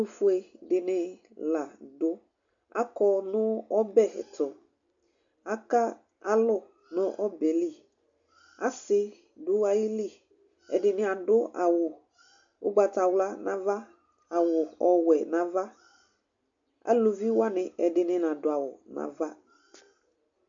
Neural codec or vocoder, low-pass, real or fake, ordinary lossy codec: none; 7.2 kHz; real; MP3, 64 kbps